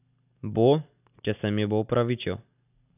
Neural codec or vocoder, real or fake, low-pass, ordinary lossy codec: none; real; 3.6 kHz; none